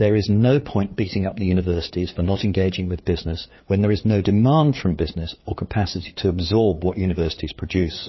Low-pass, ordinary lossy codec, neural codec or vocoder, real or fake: 7.2 kHz; MP3, 24 kbps; codec, 16 kHz, 4 kbps, FreqCodec, larger model; fake